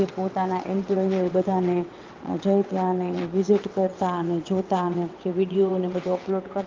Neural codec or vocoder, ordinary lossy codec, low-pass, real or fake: vocoder, 22.05 kHz, 80 mel bands, WaveNeXt; Opus, 32 kbps; 7.2 kHz; fake